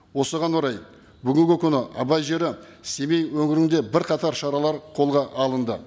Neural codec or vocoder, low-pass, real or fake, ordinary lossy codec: none; none; real; none